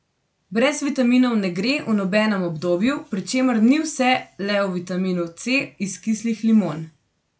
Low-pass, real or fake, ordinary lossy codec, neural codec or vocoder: none; real; none; none